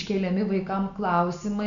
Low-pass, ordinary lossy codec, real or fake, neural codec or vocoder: 7.2 kHz; MP3, 96 kbps; real; none